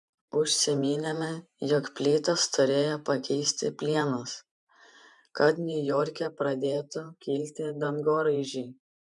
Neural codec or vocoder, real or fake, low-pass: vocoder, 44.1 kHz, 128 mel bands every 512 samples, BigVGAN v2; fake; 10.8 kHz